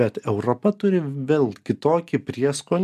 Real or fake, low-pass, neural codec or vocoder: real; 14.4 kHz; none